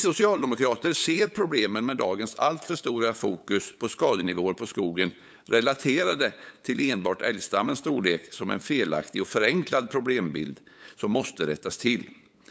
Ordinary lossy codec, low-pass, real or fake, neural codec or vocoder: none; none; fake; codec, 16 kHz, 8 kbps, FunCodec, trained on LibriTTS, 25 frames a second